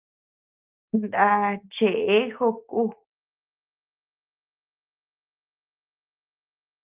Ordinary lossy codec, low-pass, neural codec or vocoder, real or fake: Opus, 24 kbps; 3.6 kHz; none; real